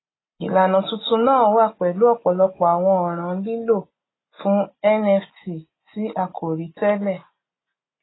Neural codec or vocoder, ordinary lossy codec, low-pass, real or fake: none; AAC, 16 kbps; 7.2 kHz; real